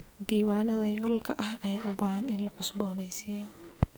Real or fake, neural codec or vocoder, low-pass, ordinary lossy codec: fake; codec, 44.1 kHz, 2.6 kbps, SNAC; none; none